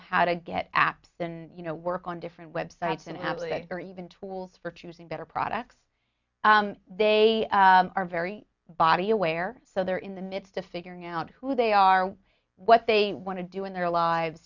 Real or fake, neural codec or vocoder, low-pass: real; none; 7.2 kHz